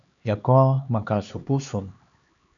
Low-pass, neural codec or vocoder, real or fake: 7.2 kHz; codec, 16 kHz, 2 kbps, X-Codec, HuBERT features, trained on LibriSpeech; fake